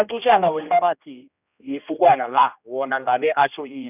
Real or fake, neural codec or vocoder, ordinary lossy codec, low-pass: fake; codec, 16 kHz, 1 kbps, X-Codec, HuBERT features, trained on general audio; none; 3.6 kHz